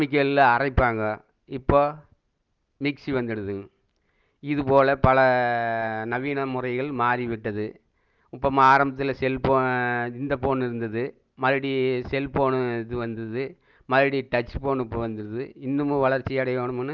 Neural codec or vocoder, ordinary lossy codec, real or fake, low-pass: none; Opus, 24 kbps; real; 7.2 kHz